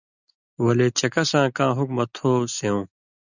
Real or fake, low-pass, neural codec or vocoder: real; 7.2 kHz; none